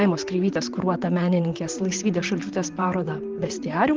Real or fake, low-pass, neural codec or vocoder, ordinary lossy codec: fake; 7.2 kHz; vocoder, 44.1 kHz, 128 mel bands, Pupu-Vocoder; Opus, 16 kbps